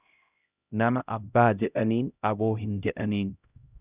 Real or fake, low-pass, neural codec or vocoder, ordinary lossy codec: fake; 3.6 kHz; codec, 16 kHz, 0.5 kbps, X-Codec, HuBERT features, trained on LibriSpeech; Opus, 64 kbps